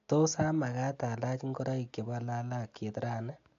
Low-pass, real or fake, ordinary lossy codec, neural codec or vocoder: 7.2 kHz; real; AAC, 48 kbps; none